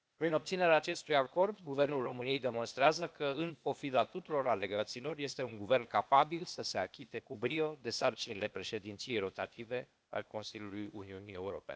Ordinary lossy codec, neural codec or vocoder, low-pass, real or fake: none; codec, 16 kHz, 0.8 kbps, ZipCodec; none; fake